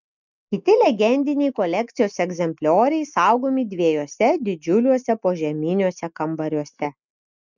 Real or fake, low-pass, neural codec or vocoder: real; 7.2 kHz; none